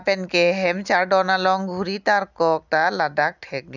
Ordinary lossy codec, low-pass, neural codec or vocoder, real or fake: none; 7.2 kHz; none; real